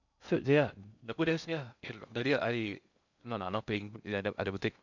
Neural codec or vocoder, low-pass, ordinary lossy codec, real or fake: codec, 16 kHz in and 24 kHz out, 0.6 kbps, FocalCodec, streaming, 2048 codes; 7.2 kHz; none; fake